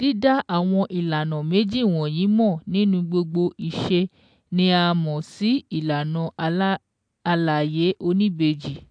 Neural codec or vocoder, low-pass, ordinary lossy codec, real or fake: none; 9.9 kHz; AAC, 64 kbps; real